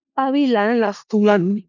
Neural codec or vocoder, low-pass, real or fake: codec, 16 kHz in and 24 kHz out, 0.4 kbps, LongCat-Audio-Codec, four codebook decoder; 7.2 kHz; fake